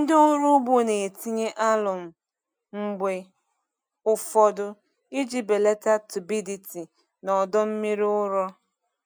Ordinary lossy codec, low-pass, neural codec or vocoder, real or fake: none; none; none; real